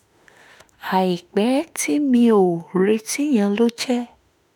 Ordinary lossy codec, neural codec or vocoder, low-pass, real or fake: none; autoencoder, 48 kHz, 32 numbers a frame, DAC-VAE, trained on Japanese speech; none; fake